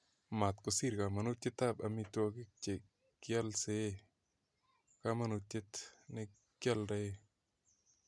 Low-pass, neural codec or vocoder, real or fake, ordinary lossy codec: none; none; real; none